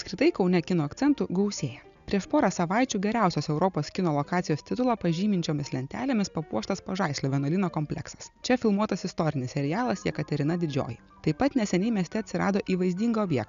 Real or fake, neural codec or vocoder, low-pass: real; none; 7.2 kHz